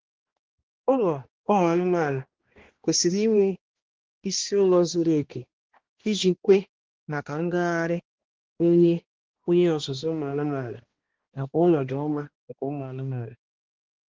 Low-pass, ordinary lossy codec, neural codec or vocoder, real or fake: 7.2 kHz; Opus, 16 kbps; codec, 16 kHz, 1 kbps, X-Codec, HuBERT features, trained on balanced general audio; fake